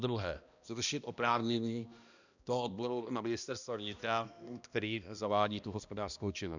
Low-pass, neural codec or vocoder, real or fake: 7.2 kHz; codec, 16 kHz, 1 kbps, X-Codec, HuBERT features, trained on balanced general audio; fake